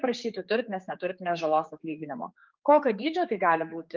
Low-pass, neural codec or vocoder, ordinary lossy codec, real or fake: 7.2 kHz; codec, 16 kHz, 4 kbps, X-Codec, HuBERT features, trained on general audio; Opus, 24 kbps; fake